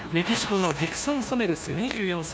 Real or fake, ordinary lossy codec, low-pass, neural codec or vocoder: fake; none; none; codec, 16 kHz, 1 kbps, FunCodec, trained on LibriTTS, 50 frames a second